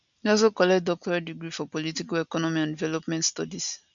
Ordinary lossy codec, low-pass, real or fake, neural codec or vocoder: none; 7.2 kHz; real; none